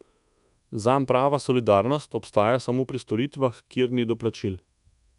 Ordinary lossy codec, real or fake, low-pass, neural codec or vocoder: none; fake; 10.8 kHz; codec, 24 kHz, 1.2 kbps, DualCodec